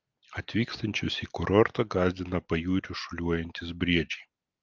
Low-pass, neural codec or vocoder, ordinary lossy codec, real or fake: 7.2 kHz; none; Opus, 32 kbps; real